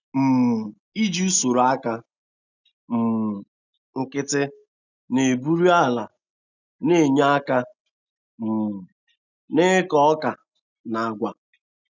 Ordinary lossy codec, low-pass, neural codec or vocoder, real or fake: none; 7.2 kHz; none; real